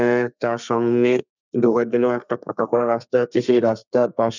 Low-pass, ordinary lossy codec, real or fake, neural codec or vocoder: 7.2 kHz; none; fake; codec, 32 kHz, 1.9 kbps, SNAC